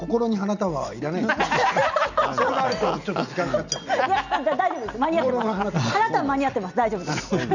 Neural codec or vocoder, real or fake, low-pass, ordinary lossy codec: none; real; 7.2 kHz; none